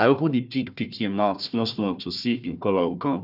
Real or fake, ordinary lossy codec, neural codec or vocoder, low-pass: fake; none; codec, 16 kHz, 1 kbps, FunCodec, trained on Chinese and English, 50 frames a second; 5.4 kHz